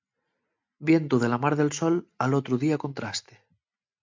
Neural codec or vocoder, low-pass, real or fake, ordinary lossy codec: none; 7.2 kHz; real; MP3, 64 kbps